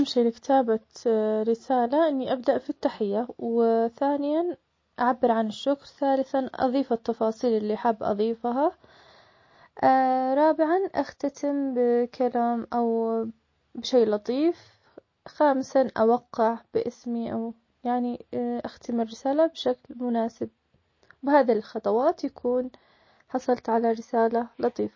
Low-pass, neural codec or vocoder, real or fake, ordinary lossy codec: 7.2 kHz; none; real; MP3, 32 kbps